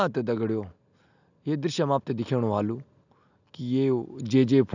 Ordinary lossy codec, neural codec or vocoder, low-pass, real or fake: none; none; 7.2 kHz; real